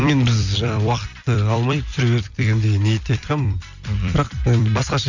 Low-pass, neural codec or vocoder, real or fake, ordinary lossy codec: 7.2 kHz; vocoder, 44.1 kHz, 128 mel bands every 256 samples, BigVGAN v2; fake; none